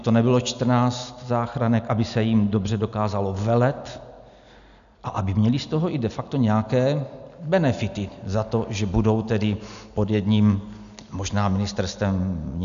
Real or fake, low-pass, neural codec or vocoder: real; 7.2 kHz; none